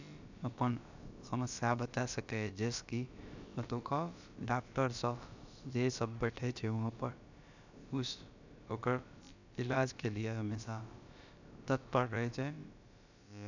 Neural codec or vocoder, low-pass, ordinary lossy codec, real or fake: codec, 16 kHz, about 1 kbps, DyCAST, with the encoder's durations; 7.2 kHz; none; fake